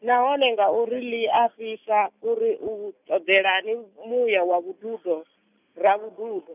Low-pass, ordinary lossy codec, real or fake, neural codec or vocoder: 3.6 kHz; none; real; none